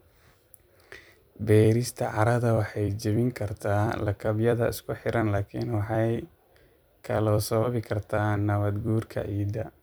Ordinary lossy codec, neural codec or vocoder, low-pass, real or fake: none; vocoder, 44.1 kHz, 128 mel bands every 256 samples, BigVGAN v2; none; fake